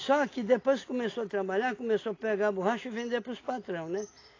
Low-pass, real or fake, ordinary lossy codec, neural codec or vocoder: 7.2 kHz; real; AAC, 32 kbps; none